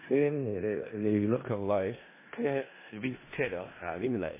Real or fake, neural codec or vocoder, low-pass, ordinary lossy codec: fake; codec, 16 kHz in and 24 kHz out, 0.4 kbps, LongCat-Audio-Codec, four codebook decoder; 3.6 kHz; MP3, 16 kbps